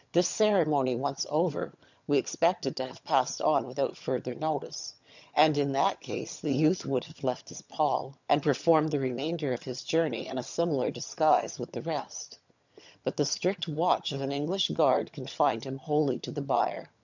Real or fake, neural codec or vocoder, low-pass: fake; vocoder, 22.05 kHz, 80 mel bands, HiFi-GAN; 7.2 kHz